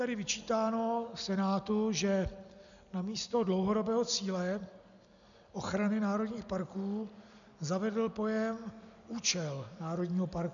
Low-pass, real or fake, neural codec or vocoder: 7.2 kHz; real; none